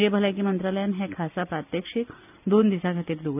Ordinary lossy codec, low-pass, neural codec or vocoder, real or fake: none; 3.6 kHz; none; real